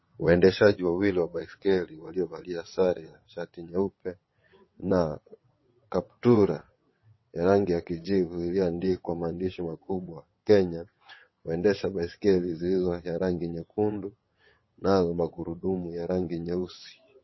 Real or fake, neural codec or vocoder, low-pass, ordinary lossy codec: fake; vocoder, 22.05 kHz, 80 mel bands, WaveNeXt; 7.2 kHz; MP3, 24 kbps